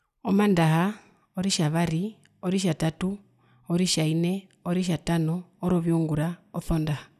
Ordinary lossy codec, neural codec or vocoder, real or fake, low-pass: none; none; real; 14.4 kHz